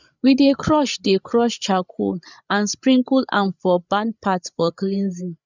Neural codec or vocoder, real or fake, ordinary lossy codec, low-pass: codec, 16 kHz, 8 kbps, FreqCodec, larger model; fake; none; 7.2 kHz